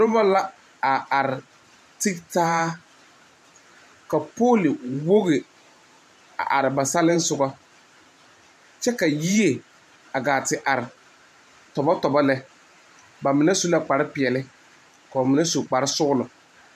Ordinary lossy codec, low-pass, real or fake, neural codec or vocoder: MP3, 96 kbps; 14.4 kHz; fake; vocoder, 44.1 kHz, 128 mel bands every 512 samples, BigVGAN v2